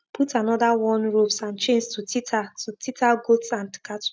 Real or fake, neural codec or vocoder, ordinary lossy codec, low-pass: real; none; none; none